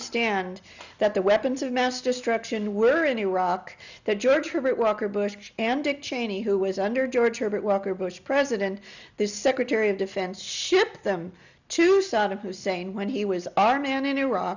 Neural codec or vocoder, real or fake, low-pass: none; real; 7.2 kHz